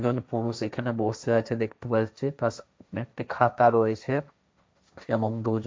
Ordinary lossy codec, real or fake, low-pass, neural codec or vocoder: none; fake; none; codec, 16 kHz, 1.1 kbps, Voila-Tokenizer